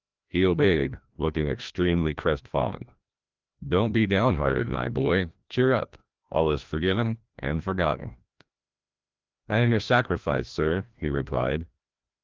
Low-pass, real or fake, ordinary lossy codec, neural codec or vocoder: 7.2 kHz; fake; Opus, 32 kbps; codec, 16 kHz, 1 kbps, FreqCodec, larger model